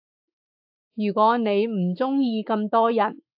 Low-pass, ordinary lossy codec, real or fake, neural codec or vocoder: 5.4 kHz; AAC, 48 kbps; fake; autoencoder, 48 kHz, 128 numbers a frame, DAC-VAE, trained on Japanese speech